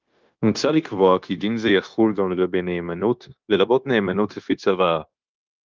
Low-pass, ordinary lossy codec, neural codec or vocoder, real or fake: 7.2 kHz; Opus, 32 kbps; codec, 16 kHz, 0.9 kbps, LongCat-Audio-Codec; fake